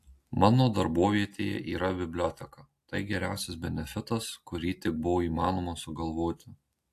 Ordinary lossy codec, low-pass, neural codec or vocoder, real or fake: AAC, 64 kbps; 14.4 kHz; none; real